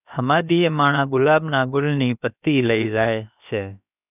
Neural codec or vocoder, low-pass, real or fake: codec, 16 kHz, about 1 kbps, DyCAST, with the encoder's durations; 3.6 kHz; fake